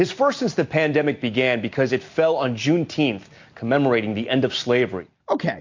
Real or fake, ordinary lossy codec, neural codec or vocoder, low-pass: real; MP3, 64 kbps; none; 7.2 kHz